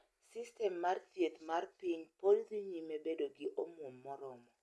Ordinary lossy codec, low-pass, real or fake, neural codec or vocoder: none; none; real; none